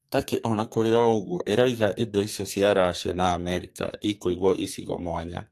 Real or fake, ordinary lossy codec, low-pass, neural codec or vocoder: fake; AAC, 64 kbps; 14.4 kHz; codec, 32 kHz, 1.9 kbps, SNAC